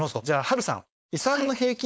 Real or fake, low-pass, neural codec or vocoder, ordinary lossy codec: fake; none; codec, 16 kHz, 4.8 kbps, FACodec; none